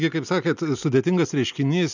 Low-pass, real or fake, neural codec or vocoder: 7.2 kHz; real; none